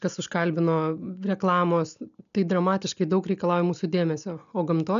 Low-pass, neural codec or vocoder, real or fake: 7.2 kHz; none; real